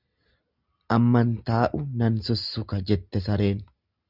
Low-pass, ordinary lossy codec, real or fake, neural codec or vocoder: 5.4 kHz; Opus, 64 kbps; real; none